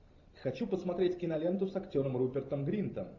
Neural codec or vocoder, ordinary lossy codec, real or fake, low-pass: vocoder, 44.1 kHz, 128 mel bands every 512 samples, BigVGAN v2; AAC, 48 kbps; fake; 7.2 kHz